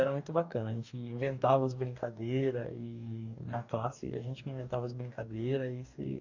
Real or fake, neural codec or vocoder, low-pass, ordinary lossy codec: fake; codec, 44.1 kHz, 2.6 kbps, DAC; 7.2 kHz; none